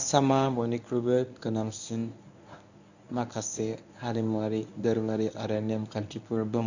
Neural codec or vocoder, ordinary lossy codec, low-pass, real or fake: codec, 24 kHz, 0.9 kbps, WavTokenizer, medium speech release version 1; none; 7.2 kHz; fake